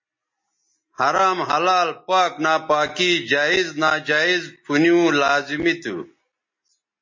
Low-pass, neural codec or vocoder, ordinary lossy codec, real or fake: 7.2 kHz; none; MP3, 32 kbps; real